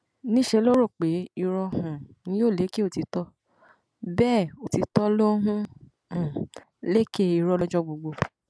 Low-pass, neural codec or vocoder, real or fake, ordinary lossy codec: none; none; real; none